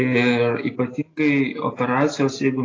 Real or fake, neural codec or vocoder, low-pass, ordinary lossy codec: real; none; 7.2 kHz; AAC, 32 kbps